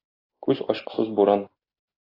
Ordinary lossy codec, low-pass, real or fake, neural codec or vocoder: AAC, 24 kbps; 5.4 kHz; real; none